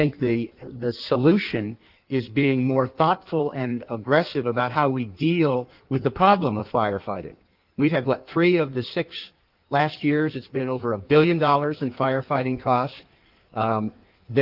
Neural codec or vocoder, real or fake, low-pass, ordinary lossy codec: codec, 16 kHz in and 24 kHz out, 1.1 kbps, FireRedTTS-2 codec; fake; 5.4 kHz; Opus, 32 kbps